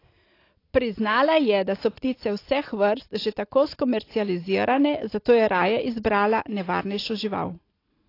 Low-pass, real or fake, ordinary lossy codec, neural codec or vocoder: 5.4 kHz; real; AAC, 32 kbps; none